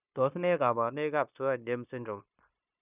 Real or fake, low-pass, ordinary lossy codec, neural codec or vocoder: fake; 3.6 kHz; none; codec, 16 kHz, 0.9 kbps, LongCat-Audio-Codec